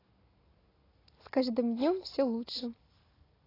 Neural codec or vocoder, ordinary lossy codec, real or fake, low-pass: none; AAC, 24 kbps; real; 5.4 kHz